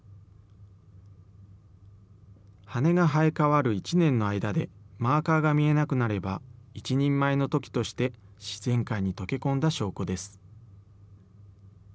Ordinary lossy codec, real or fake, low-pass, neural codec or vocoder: none; real; none; none